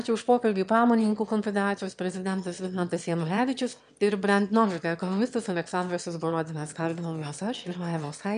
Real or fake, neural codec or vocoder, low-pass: fake; autoencoder, 22.05 kHz, a latent of 192 numbers a frame, VITS, trained on one speaker; 9.9 kHz